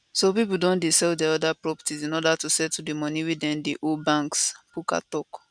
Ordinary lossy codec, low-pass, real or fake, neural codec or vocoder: none; 9.9 kHz; real; none